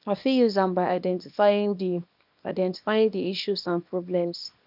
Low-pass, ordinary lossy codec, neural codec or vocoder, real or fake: 5.4 kHz; none; codec, 24 kHz, 0.9 kbps, WavTokenizer, small release; fake